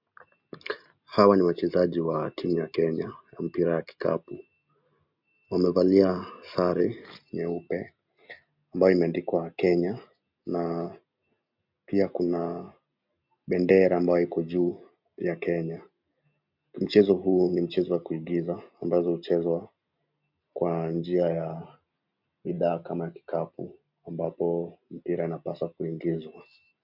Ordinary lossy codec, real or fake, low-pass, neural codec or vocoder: MP3, 48 kbps; real; 5.4 kHz; none